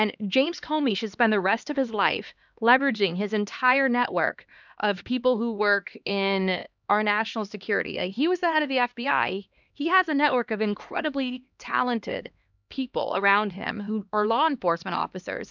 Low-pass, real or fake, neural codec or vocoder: 7.2 kHz; fake; codec, 16 kHz, 2 kbps, X-Codec, HuBERT features, trained on LibriSpeech